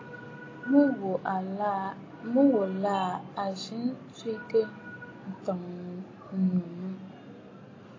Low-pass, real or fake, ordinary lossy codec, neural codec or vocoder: 7.2 kHz; real; AAC, 32 kbps; none